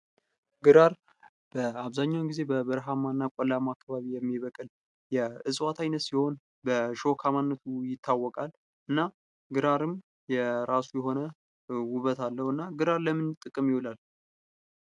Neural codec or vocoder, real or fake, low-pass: none; real; 10.8 kHz